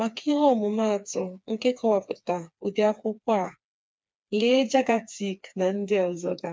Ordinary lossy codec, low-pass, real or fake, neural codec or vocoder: none; none; fake; codec, 16 kHz, 4 kbps, FreqCodec, smaller model